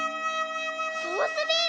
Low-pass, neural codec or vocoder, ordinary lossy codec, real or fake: none; none; none; real